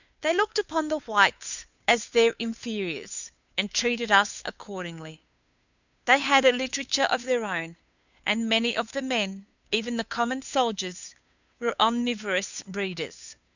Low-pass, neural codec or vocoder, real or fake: 7.2 kHz; codec, 16 kHz, 2 kbps, FunCodec, trained on Chinese and English, 25 frames a second; fake